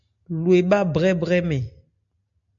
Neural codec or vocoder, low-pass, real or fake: none; 7.2 kHz; real